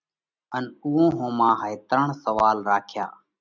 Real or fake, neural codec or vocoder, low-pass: real; none; 7.2 kHz